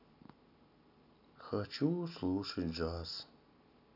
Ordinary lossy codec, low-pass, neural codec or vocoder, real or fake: none; 5.4 kHz; none; real